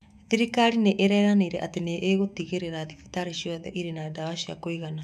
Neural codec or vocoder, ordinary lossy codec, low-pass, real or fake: autoencoder, 48 kHz, 128 numbers a frame, DAC-VAE, trained on Japanese speech; none; 14.4 kHz; fake